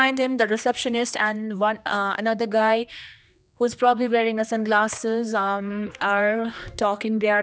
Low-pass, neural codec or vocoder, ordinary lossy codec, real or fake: none; codec, 16 kHz, 2 kbps, X-Codec, HuBERT features, trained on general audio; none; fake